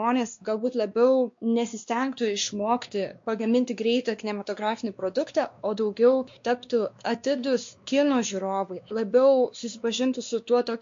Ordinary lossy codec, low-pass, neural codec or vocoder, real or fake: MP3, 48 kbps; 7.2 kHz; codec, 16 kHz, 2 kbps, X-Codec, WavLM features, trained on Multilingual LibriSpeech; fake